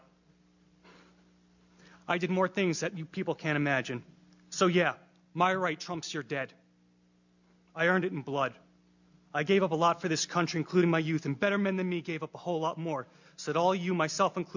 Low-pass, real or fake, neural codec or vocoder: 7.2 kHz; real; none